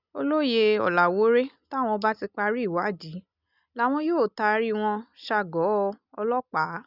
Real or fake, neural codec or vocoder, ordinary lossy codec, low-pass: real; none; none; 5.4 kHz